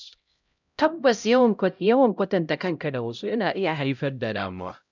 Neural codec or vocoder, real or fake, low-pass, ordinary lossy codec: codec, 16 kHz, 0.5 kbps, X-Codec, HuBERT features, trained on LibriSpeech; fake; 7.2 kHz; none